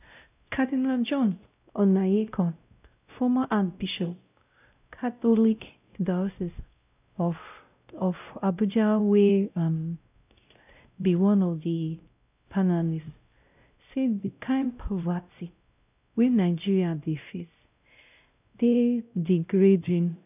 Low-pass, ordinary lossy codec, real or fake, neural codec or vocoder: 3.6 kHz; none; fake; codec, 16 kHz, 0.5 kbps, X-Codec, WavLM features, trained on Multilingual LibriSpeech